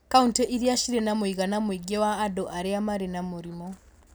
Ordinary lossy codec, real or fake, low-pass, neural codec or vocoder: none; real; none; none